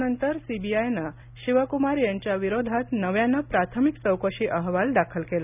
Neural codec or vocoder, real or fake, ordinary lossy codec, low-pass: none; real; none; 3.6 kHz